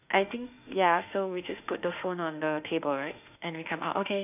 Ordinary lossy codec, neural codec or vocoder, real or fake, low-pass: none; autoencoder, 48 kHz, 32 numbers a frame, DAC-VAE, trained on Japanese speech; fake; 3.6 kHz